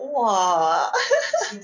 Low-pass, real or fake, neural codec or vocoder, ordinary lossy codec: 7.2 kHz; real; none; none